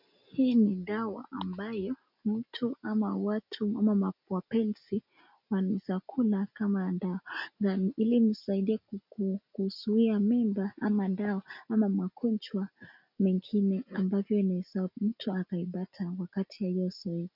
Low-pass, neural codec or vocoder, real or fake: 5.4 kHz; none; real